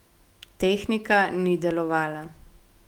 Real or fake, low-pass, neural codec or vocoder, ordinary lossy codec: fake; 19.8 kHz; autoencoder, 48 kHz, 128 numbers a frame, DAC-VAE, trained on Japanese speech; Opus, 24 kbps